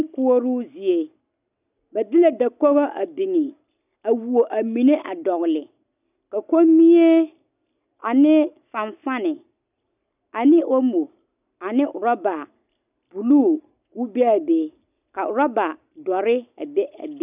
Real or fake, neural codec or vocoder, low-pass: real; none; 3.6 kHz